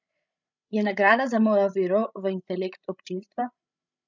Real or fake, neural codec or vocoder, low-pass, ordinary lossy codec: fake; codec, 16 kHz, 16 kbps, FreqCodec, larger model; 7.2 kHz; none